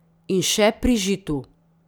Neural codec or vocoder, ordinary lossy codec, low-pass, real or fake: none; none; none; real